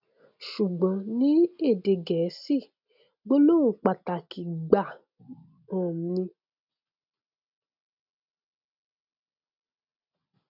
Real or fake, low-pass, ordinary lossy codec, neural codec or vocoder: real; 5.4 kHz; none; none